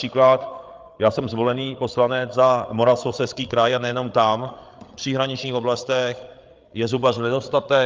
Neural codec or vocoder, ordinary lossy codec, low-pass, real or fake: codec, 16 kHz, 8 kbps, FreqCodec, larger model; Opus, 24 kbps; 7.2 kHz; fake